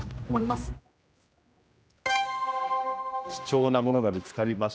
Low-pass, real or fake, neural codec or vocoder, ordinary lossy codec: none; fake; codec, 16 kHz, 1 kbps, X-Codec, HuBERT features, trained on general audio; none